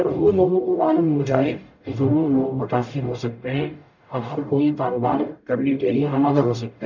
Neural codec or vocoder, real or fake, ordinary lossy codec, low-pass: codec, 44.1 kHz, 0.9 kbps, DAC; fake; none; 7.2 kHz